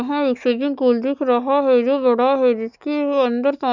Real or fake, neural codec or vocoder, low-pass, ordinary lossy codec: real; none; 7.2 kHz; none